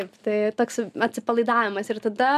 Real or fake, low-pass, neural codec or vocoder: real; 14.4 kHz; none